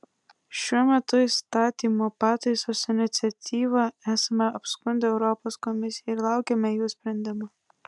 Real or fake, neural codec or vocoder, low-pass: real; none; 10.8 kHz